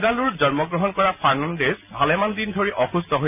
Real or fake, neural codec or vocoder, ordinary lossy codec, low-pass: real; none; none; 3.6 kHz